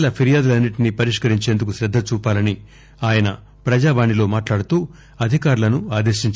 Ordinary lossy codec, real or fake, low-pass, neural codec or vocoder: none; real; none; none